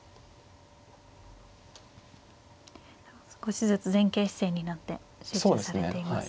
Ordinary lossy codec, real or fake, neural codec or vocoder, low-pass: none; real; none; none